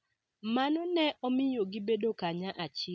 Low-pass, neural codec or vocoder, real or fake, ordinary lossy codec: none; none; real; none